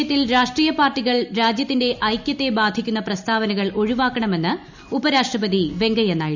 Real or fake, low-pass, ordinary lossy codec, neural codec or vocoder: real; 7.2 kHz; none; none